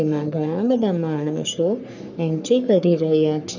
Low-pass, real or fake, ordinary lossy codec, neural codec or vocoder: 7.2 kHz; fake; none; codec, 44.1 kHz, 3.4 kbps, Pupu-Codec